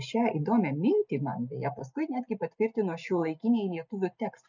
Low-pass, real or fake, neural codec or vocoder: 7.2 kHz; real; none